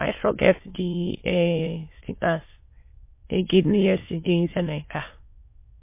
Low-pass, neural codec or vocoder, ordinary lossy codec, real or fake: 3.6 kHz; autoencoder, 22.05 kHz, a latent of 192 numbers a frame, VITS, trained on many speakers; MP3, 24 kbps; fake